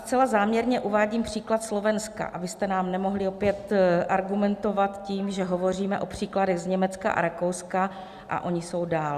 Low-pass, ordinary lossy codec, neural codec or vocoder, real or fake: 14.4 kHz; Opus, 64 kbps; none; real